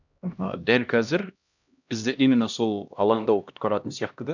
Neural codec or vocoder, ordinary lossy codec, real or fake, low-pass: codec, 16 kHz, 1 kbps, X-Codec, HuBERT features, trained on LibriSpeech; none; fake; 7.2 kHz